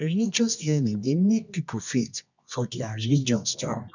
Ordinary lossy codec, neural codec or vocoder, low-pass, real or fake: none; codec, 24 kHz, 0.9 kbps, WavTokenizer, medium music audio release; 7.2 kHz; fake